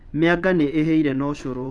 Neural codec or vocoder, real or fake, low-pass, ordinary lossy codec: vocoder, 22.05 kHz, 80 mel bands, WaveNeXt; fake; none; none